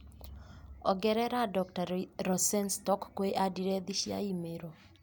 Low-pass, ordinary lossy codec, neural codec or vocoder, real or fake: none; none; none; real